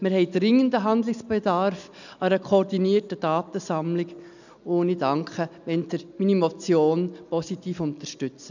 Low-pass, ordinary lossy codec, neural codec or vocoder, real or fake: 7.2 kHz; none; none; real